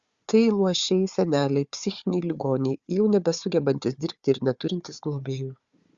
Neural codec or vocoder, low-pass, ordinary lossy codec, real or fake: codec, 16 kHz, 4 kbps, FunCodec, trained on Chinese and English, 50 frames a second; 7.2 kHz; Opus, 64 kbps; fake